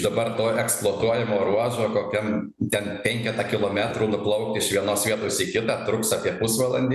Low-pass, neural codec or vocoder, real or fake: 14.4 kHz; none; real